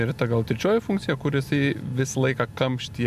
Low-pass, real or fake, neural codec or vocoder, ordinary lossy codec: 14.4 kHz; real; none; MP3, 96 kbps